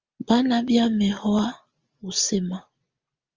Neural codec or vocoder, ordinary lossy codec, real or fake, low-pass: none; Opus, 32 kbps; real; 7.2 kHz